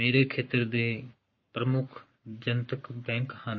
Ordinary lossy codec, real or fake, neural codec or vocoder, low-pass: MP3, 32 kbps; fake; codec, 44.1 kHz, 7.8 kbps, Pupu-Codec; 7.2 kHz